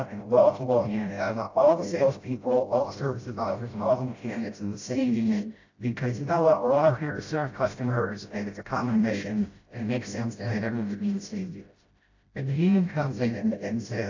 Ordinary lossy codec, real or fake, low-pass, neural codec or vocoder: AAC, 48 kbps; fake; 7.2 kHz; codec, 16 kHz, 0.5 kbps, FreqCodec, smaller model